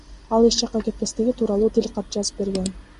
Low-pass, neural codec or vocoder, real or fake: 10.8 kHz; none; real